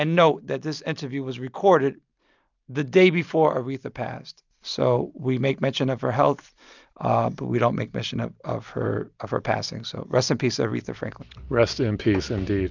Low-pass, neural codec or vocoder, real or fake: 7.2 kHz; none; real